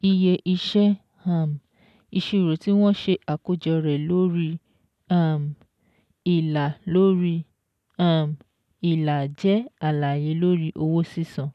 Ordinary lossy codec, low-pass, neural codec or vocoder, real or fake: none; 14.4 kHz; none; real